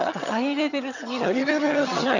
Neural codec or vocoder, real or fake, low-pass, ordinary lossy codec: vocoder, 22.05 kHz, 80 mel bands, HiFi-GAN; fake; 7.2 kHz; none